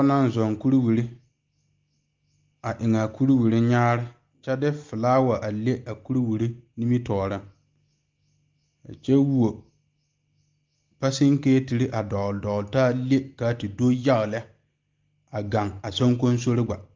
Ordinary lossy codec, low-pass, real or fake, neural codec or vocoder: Opus, 32 kbps; 7.2 kHz; real; none